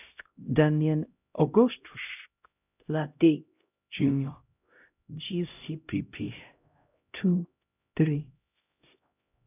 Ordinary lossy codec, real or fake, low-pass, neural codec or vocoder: none; fake; 3.6 kHz; codec, 16 kHz, 0.5 kbps, X-Codec, HuBERT features, trained on LibriSpeech